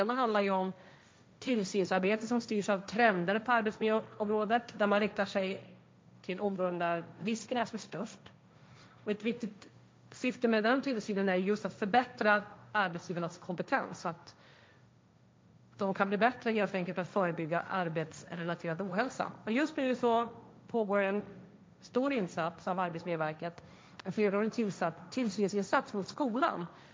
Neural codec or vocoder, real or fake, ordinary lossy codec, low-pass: codec, 16 kHz, 1.1 kbps, Voila-Tokenizer; fake; none; none